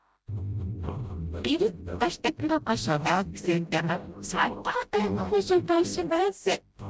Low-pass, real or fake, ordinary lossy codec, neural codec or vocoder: none; fake; none; codec, 16 kHz, 0.5 kbps, FreqCodec, smaller model